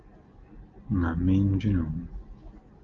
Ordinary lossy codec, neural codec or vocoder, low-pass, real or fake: Opus, 32 kbps; none; 7.2 kHz; real